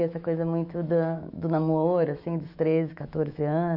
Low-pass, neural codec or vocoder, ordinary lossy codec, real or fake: 5.4 kHz; autoencoder, 48 kHz, 128 numbers a frame, DAC-VAE, trained on Japanese speech; none; fake